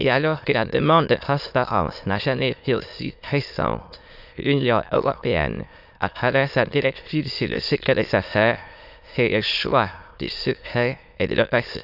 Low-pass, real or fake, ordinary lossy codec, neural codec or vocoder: 5.4 kHz; fake; none; autoencoder, 22.05 kHz, a latent of 192 numbers a frame, VITS, trained on many speakers